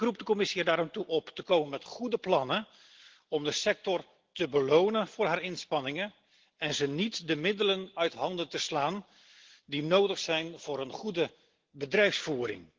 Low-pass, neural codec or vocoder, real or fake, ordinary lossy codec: 7.2 kHz; none; real; Opus, 16 kbps